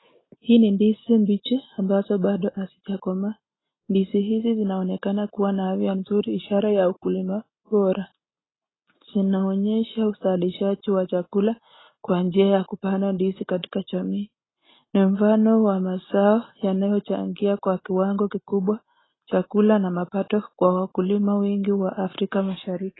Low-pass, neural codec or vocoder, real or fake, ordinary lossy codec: 7.2 kHz; none; real; AAC, 16 kbps